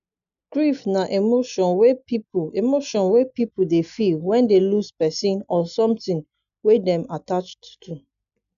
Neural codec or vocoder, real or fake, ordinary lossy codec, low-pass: none; real; none; 7.2 kHz